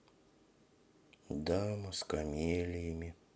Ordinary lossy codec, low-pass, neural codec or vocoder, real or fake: none; none; none; real